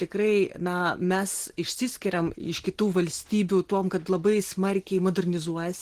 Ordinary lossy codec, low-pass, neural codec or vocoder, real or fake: Opus, 16 kbps; 14.4 kHz; none; real